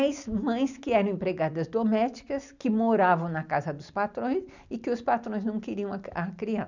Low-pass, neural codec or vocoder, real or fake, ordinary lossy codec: 7.2 kHz; none; real; none